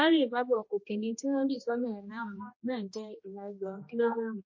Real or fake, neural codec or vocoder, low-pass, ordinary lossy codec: fake; codec, 16 kHz, 1 kbps, X-Codec, HuBERT features, trained on general audio; 7.2 kHz; MP3, 32 kbps